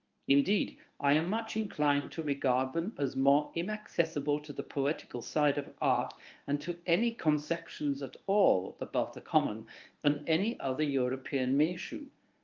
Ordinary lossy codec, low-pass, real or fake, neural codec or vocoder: Opus, 24 kbps; 7.2 kHz; fake; codec, 24 kHz, 0.9 kbps, WavTokenizer, medium speech release version 1